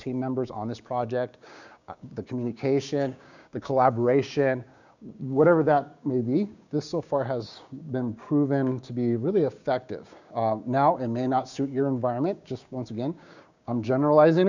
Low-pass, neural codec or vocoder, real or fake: 7.2 kHz; codec, 16 kHz, 6 kbps, DAC; fake